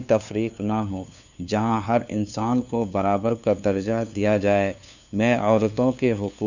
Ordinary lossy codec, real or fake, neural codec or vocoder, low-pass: none; fake; codec, 16 kHz, 2 kbps, FunCodec, trained on LibriTTS, 25 frames a second; 7.2 kHz